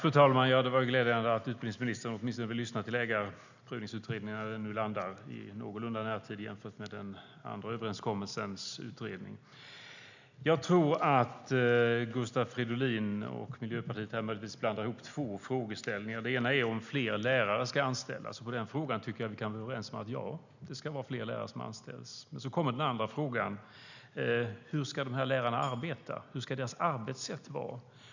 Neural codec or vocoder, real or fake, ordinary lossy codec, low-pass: none; real; none; 7.2 kHz